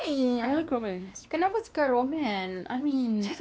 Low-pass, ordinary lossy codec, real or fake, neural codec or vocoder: none; none; fake; codec, 16 kHz, 2 kbps, X-Codec, WavLM features, trained on Multilingual LibriSpeech